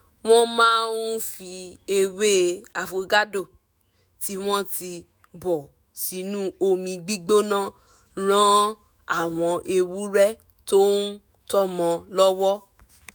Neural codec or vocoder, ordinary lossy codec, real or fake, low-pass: autoencoder, 48 kHz, 128 numbers a frame, DAC-VAE, trained on Japanese speech; none; fake; none